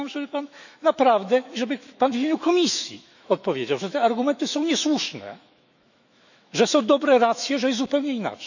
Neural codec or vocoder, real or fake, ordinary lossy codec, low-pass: autoencoder, 48 kHz, 128 numbers a frame, DAC-VAE, trained on Japanese speech; fake; none; 7.2 kHz